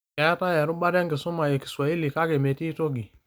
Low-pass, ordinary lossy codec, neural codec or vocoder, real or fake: none; none; none; real